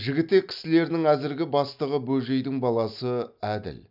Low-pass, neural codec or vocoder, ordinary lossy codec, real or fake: 5.4 kHz; none; none; real